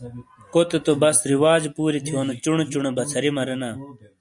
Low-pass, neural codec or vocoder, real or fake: 10.8 kHz; none; real